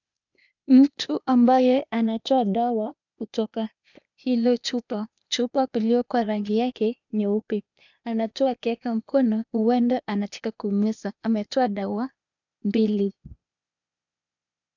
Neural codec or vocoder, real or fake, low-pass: codec, 16 kHz, 0.8 kbps, ZipCodec; fake; 7.2 kHz